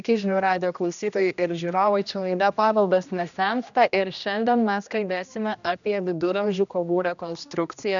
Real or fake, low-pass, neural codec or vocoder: fake; 7.2 kHz; codec, 16 kHz, 1 kbps, X-Codec, HuBERT features, trained on general audio